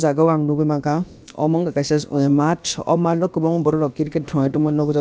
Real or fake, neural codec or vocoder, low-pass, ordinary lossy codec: fake; codec, 16 kHz, about 1 kbps, DyCAST, with the encoder's durations; none; none